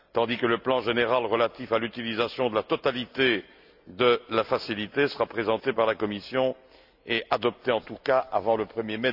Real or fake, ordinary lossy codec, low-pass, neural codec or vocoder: real; AAC, 48 kbps; 5.4 kHz; none